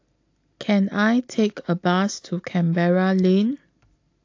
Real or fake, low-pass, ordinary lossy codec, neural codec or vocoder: real; 7.2 kHz; AAC, 48 kbps; none